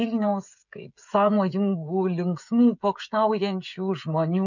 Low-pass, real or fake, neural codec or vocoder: 7.2 kHz; fake; codec, 16 kHz, 16 kbps, FreqCodec, smaller model